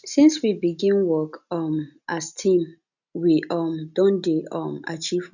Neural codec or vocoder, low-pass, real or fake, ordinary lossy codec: none; 7.2 kHz; real; none